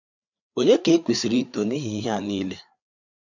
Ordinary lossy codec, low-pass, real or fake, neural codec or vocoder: none; 7.2 kHz; fake; codec, 16 kHz, 4 kbps, FreqCodec, larger model